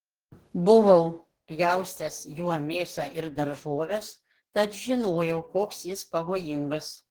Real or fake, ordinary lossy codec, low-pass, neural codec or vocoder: fake; Opus, 16 kbps; 19.8 kHz; codec, 44.1 kHz, 2.6 kbps, DAC